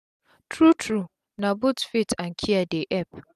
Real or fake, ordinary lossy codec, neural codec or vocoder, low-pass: real; none; none; 14.4 kHz